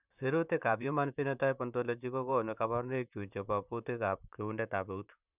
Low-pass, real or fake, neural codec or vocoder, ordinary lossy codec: 3.6 kHz; fake; vocoder, 44.1 kHz, 128 mel bands, Pupu-Vocoder; none